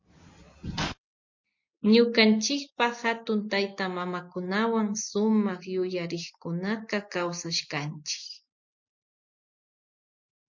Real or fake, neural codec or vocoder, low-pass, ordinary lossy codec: real; none; 7.2 kHz; MP3, 48 kbps